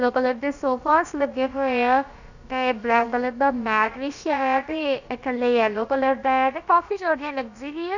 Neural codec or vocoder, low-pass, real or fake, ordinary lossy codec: codec, 16 kHz, about 1 kbps, DyCAST, with the encoder's durations; 7.2 kHz; fake; none